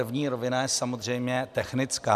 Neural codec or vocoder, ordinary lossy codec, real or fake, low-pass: none; MP3, 96 kbps; real; 14.4 kHz